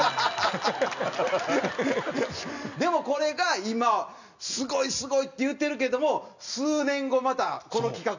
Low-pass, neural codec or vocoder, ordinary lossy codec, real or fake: 7.2 kHz; none; none; real